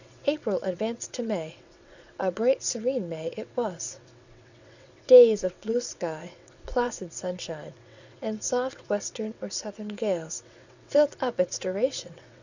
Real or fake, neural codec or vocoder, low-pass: fake; vocoder, 22.05 kHz, 80 mel bands, WaveNeXt; 7.2 kHz